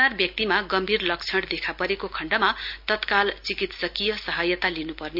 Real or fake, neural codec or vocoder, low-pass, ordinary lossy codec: real; none; 5.4 kHz; MP3, 48 kbps